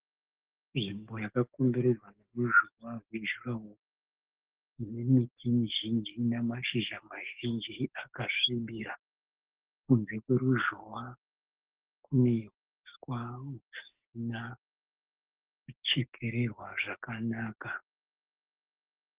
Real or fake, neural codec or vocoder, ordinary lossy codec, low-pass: fake; codec, 44.1 kHz, 7.8 kbps, DAC; Opus, 16 kbps; 3.6 kHz